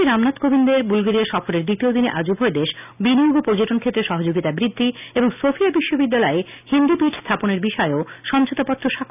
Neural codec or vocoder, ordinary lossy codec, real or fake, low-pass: none; none; real; 3.6 kHz